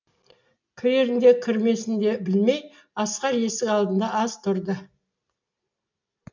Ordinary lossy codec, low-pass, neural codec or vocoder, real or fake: MP3, 64 kbps; 7.2 kHz; none; real